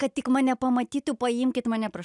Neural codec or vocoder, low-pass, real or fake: none; 10.8 kHz; real